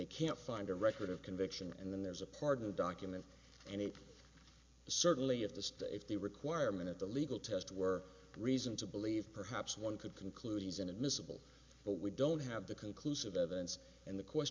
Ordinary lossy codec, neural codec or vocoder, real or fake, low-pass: MP3, 64 kbps; none; real; 7.2 kHz